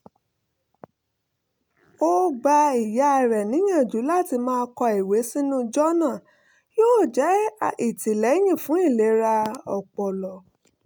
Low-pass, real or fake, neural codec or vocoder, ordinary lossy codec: none; real; none; none